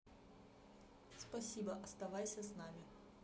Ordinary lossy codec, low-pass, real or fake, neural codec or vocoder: none; none; real; none